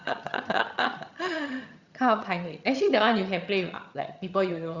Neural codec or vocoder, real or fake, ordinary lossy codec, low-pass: vocoder, 22.05 kHz, 80 mel bands, HiFi-GAN; fake; Opus, 64 kbps; 7.2 kHz